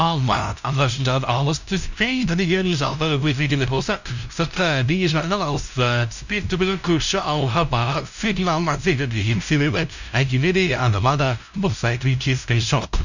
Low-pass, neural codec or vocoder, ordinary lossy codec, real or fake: 7.2 kHz; codec, 16 kHz, 0.5 kbps, FunCodec, trained on LibriTTS, 25 frames a second; none; fake